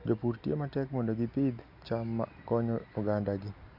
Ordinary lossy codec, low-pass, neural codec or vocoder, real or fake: none; 5.4 kHz; none; real